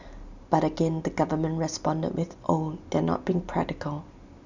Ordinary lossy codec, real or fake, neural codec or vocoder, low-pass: none; real; none; 7.2 kHz